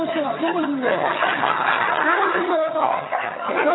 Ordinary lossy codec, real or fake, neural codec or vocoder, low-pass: AAC, 16 kbps; fake; vocoder, 22.05 kHz, 80 mel bands, HiFi-GAN; 7.2 kHz